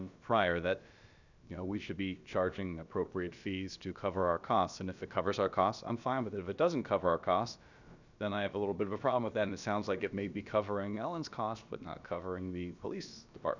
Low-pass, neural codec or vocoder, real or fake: 7.2 kHz; codec, 16 kHz, about 1 kbps, DyCAST, with the encoder's durations; fake